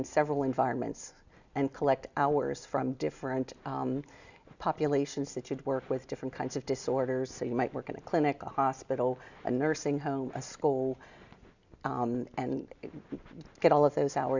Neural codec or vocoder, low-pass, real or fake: none; 7.2 kHz; real